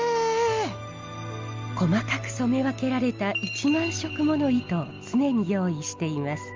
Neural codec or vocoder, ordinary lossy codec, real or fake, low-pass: none; Opus, 32 kbps; real; 7.2 kHz